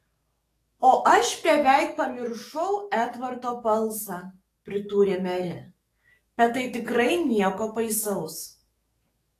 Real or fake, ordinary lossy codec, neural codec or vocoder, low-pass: fake; AAC, 48 kbps; codec, 44.1 kHz, 7.8 kbps, DAC; 14.4 kHz